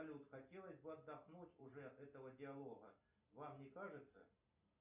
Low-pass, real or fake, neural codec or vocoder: 3.6 kHz; real; none